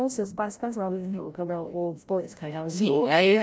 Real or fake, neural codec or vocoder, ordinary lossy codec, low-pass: fake; codec, 16 kHz, 0.5 kbps, FreqCodec, larger model; none; none